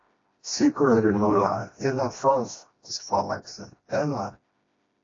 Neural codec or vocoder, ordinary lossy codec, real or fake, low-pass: codec, 16 kHz, 1 kbps, FreqCodec, smaller model; AAC, 32 kbps; fake; 7.2 kHz